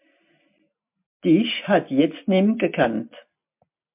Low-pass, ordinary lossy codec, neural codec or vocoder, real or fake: 3.6 kHz; MP3, 32 kbps; none; real